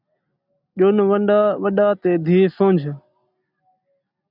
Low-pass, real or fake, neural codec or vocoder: 5.4 kHz; real; none